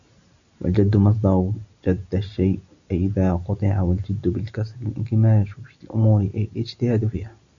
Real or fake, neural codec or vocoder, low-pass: real; none; 7.2 kHz